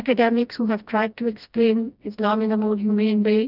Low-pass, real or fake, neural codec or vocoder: 5.4 kHz; fake; codec, 16 kHz, 1 kbps, FreqCodec, smaller model